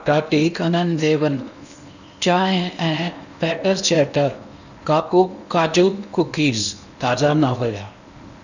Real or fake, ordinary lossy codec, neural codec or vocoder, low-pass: fake; none; codec, 16 kHz in and 24 kHz out, 0.8 kbps, FocalCodec, streaming, 65536 codes; 7.2 kHz